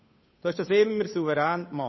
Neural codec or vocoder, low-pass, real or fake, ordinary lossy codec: codec, 16 kHz, 8 kbps, FunCodec, trained on Chinese and English, 25 frames a second; 7.2 kHz; fake; MP3, 24 kbps